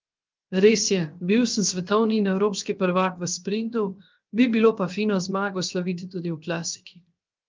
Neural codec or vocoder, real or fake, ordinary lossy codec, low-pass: codec, 16 kHz, 0.7 kbps, FocalCodec; fake; Opus, 24 kbps; 7.2 kHz